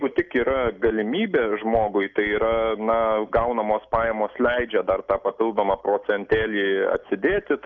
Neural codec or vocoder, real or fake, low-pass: none; real; 7.2 kHz